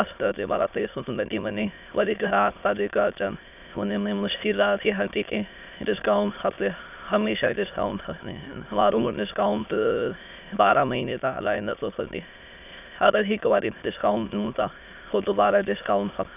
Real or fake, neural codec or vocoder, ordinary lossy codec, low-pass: fake; autoencoder, 22.05 kHz, a latent of 192 numbers a frame, VITS, trained on many speakers; none; 3.6 kHz